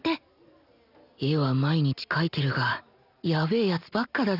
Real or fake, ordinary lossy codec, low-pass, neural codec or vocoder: real; none; 5.4 kHz; none